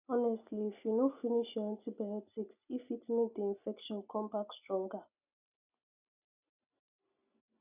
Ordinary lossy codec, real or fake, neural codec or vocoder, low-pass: none; real; none; 3.6 kHz